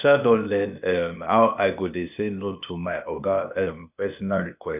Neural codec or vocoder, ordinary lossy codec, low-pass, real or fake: codec, 16 kHz, 0.8 kbps, ZipCodec; none; 3.6 kHz; fake